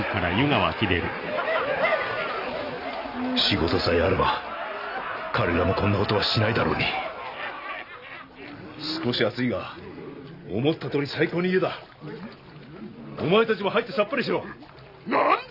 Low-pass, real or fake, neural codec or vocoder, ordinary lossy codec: 5.4 kHz; real; none; none